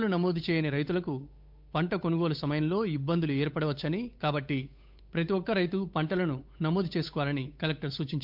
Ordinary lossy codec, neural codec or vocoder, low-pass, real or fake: MP3, 48 kbps; codec, 16 kHz, 8 kbps, FunCodec, trained on Chinese and English, 25 frames a second; 5.4 kHz; fake